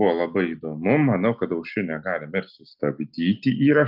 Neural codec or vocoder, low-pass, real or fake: none; 5.4 kHz; real